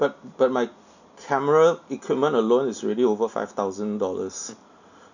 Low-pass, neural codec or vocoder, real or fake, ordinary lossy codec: 7.2 kHz; none; real; none